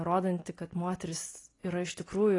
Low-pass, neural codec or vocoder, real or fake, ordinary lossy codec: 10.8 kHz; none; real; AAC, 32 kbps